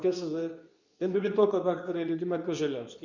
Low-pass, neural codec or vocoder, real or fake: 7.2 kHz; codec, 24 kHz, 0.9 kbps, WavTokenizer, medium speech release version 2; fake